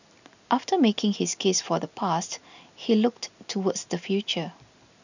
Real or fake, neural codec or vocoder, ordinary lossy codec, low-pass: real; none; none; 7.2 kHz